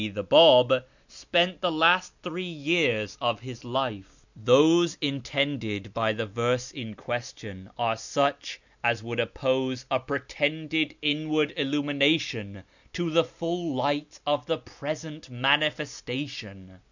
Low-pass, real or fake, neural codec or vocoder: 7.2 kHz; real; none